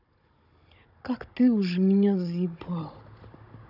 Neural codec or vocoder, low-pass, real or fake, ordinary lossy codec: codec, 16 kHz, 16 kbps, FunCodec, trained on Chinese and English, 50 frames a second; 5.4 kHz; fake; none